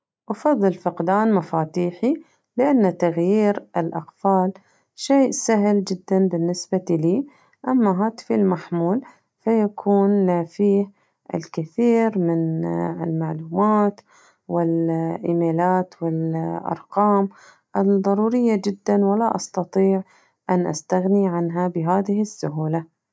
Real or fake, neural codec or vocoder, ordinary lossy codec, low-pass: real; none; none; none